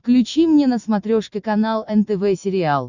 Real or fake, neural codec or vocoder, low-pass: real; none; 7.2 kHz